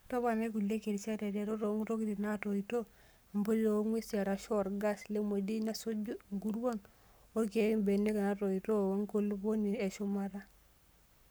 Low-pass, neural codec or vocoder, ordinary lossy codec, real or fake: none; codec, 44.1 kHz, 7.8 kbps, Pupu-Codec; none; fake